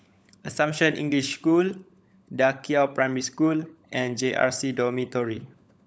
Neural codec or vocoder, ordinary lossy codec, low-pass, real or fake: codec, 16 kHz, 16 kbps, FunCodec, trained on LibriTTS, 50 frames a second; none; none; fake